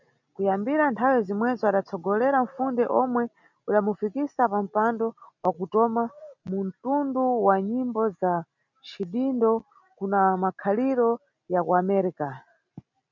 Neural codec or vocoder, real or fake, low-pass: none; real; 7.2 kHz